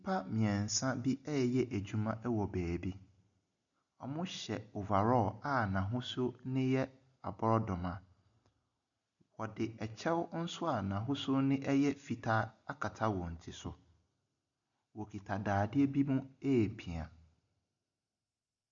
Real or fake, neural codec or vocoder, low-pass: real; none; 7.2 kHz